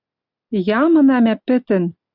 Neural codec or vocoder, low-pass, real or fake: none; 5.4 kHz; real